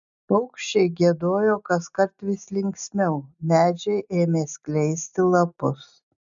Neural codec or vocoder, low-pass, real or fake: none; 7.2 kHz; real